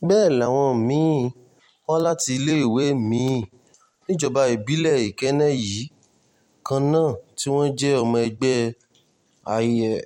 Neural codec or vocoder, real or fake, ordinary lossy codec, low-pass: vocoder, 44.1 kHz, 128 mel bands every 256 samples, BigVGAN v2; fake; MP3, 64 kbps; 19.8 kHz